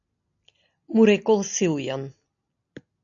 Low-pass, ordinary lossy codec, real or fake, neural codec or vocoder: 7.2 kHz; AAC, 64 kbps; real; none